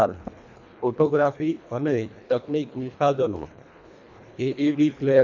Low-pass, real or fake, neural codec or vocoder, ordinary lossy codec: 7.2 kHz; fake; codec, 24 kHz, 1.5 kbps, HILCodec; none